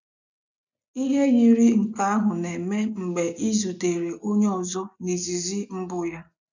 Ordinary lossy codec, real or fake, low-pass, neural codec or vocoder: none; real; 7.2 kHz; none